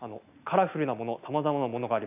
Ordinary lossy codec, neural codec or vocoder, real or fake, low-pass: none; none; real; 3.6 kHz